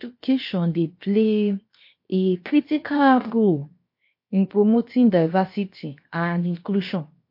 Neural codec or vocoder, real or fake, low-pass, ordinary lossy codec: codec, 16 kHz, 0.7 kbps, FocalCodec; fake; 5.4 kHz; MP3, 32 kbps